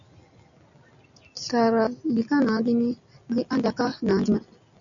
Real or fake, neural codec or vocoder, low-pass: real; none; 7.2 kHz